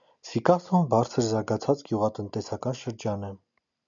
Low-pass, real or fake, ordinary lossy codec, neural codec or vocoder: 7.2 kHz; real; MP3, 96 kbps; none